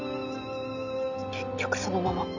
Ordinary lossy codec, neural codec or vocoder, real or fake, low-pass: none; none; real; 7.2 kHz